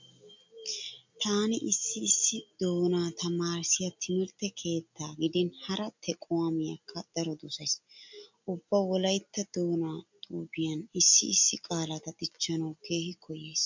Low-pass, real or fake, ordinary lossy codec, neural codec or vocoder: 7.2 kHz; real; MP3, 64 kbps; none